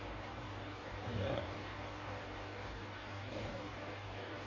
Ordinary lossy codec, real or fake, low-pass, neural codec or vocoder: MP3, 32 kbps; fake; 7.2 kHz; codec, 44.1 kHz, 2.6 kbps, DAC